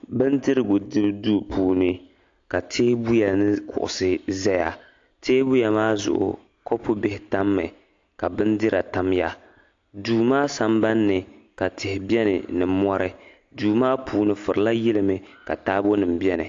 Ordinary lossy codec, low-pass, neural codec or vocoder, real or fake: AAC, 48 kbps; 7.2 kHz; none; real